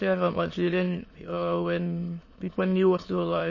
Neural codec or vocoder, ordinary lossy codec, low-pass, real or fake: autoencoder, 22.05 kHz, a latent of 192 numbers a frame, VITS, trained on many speakers; MP3, 32 kbps; 7.2 kHz; fake